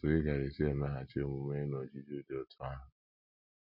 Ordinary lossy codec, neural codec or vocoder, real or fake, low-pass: none; none; real; 5.4 kHz